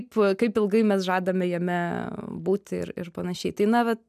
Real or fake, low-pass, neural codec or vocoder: real; 14.4 kHz; none